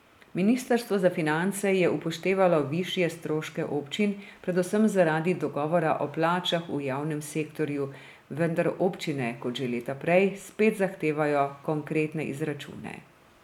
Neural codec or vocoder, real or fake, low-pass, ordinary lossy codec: none; real; 19.8 kHz; none